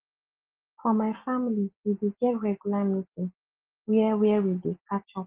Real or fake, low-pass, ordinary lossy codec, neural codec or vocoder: real; 3.6 kHz; Opus, 16 kbps; none